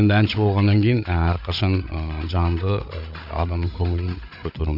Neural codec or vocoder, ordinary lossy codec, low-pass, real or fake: codec, 16 kHz, 8 kbps, FreqCodec, larger model; none; 5.4 kHz; fake